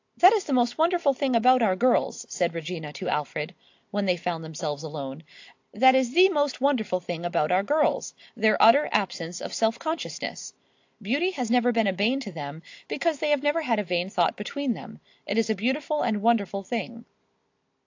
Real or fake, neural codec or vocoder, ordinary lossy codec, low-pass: real; none; AAC, 48 kbps; 7.2 kHz